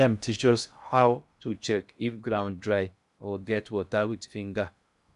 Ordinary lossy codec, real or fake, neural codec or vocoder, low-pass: none; fake; codec, 16 kHz in and 24 kHz out, 0.6 kbps, FocalCodec, streaming, 2048 codes; 10.8 kHz